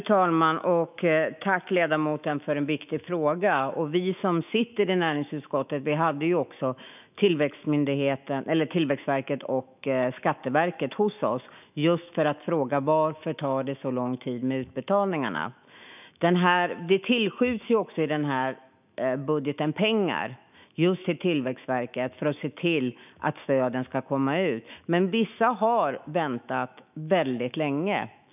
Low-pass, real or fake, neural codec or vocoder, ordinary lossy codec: 3.6 kHz; fake; autoencoder, 48 kHz, 128 numbers a frame, DAC-VAE, trained on Japanese speech; none